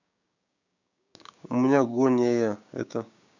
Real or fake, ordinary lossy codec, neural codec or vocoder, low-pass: fake; none; codec, 16 kHz, 6 kbps, DAC; 7.2 kHz